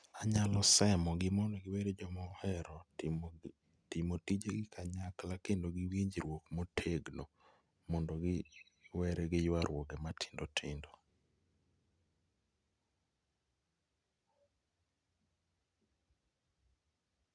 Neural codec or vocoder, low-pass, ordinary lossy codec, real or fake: none; 9.9 kHz; none; real